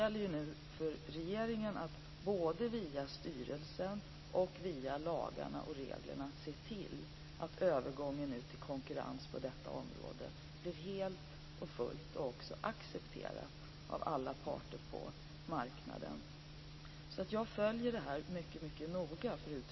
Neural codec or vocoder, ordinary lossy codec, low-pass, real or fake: none; MP3, 24 kbps; 7.2 kHz; real